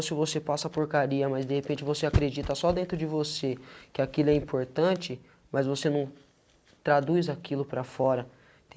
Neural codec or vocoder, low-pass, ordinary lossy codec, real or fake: none; none; none; real